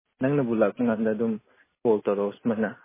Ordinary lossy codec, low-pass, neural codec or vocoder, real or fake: MP3, 16 kbps; 3.6 kHz; none; real